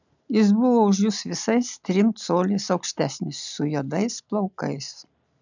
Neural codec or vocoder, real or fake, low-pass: none; real; 7.2 kHz